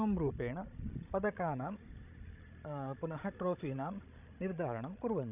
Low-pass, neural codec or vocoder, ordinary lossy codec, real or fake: 3.6 kHz; codec, 16 kHz, 16 kbps, FreqCodec, larger model; none; fake